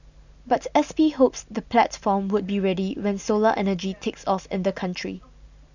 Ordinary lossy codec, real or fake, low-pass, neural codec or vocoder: none; real; 7.2 kHz; none